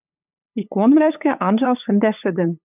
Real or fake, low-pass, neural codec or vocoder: fake; 3.6 kHz; codec, 16 kHz, 8 kbps, FunCodec, trained on LibriTTS, 25 frames a second